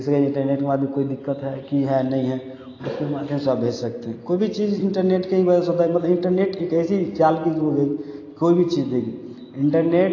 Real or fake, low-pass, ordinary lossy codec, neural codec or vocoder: real; 7.2 kHz; AAC, 32 kbps; none